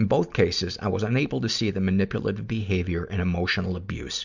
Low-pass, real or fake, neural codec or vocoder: 7.2 kHz; real; none